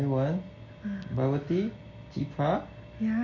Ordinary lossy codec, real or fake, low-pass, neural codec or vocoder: none; real; 7.2 kHz; none